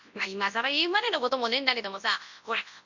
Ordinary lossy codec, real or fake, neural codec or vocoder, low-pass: none; fake; codec, 24 kHz, 0.9 kbps, WavTokenizer, large speech release; 7.2 kHz